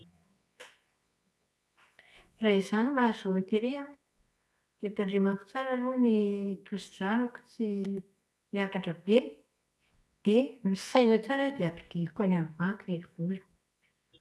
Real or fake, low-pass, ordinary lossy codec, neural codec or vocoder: fake; none; none; codec, 24 kHz, 0.9 kbps, WavTokenizer, medium music audio release